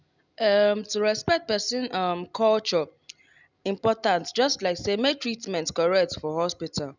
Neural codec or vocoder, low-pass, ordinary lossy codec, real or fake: none; 7.2 kHz; none; real